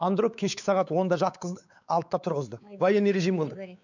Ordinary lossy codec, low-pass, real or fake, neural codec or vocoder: none; 7.2 kHz; fake; codec, 16 kHz, 4 kbps, X-Codec, WavLM features, trained on Multilingual LibriSpeech